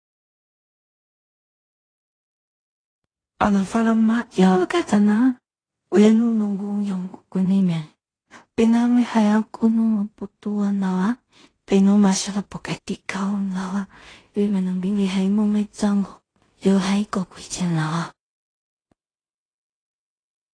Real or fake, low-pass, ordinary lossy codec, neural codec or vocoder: fake; 9.9 kHz; AAC, 32 kbps; codec, 16 kHz in and 24 kHz out, 0.4 kbps, LongCat-Audio-Codec, two codebook decoder